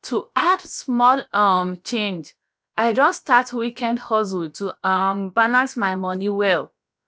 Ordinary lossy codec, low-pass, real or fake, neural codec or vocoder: none; none; fake; codec, 16 kHz, about 1 kbps, DyCAST, with the encoder's durations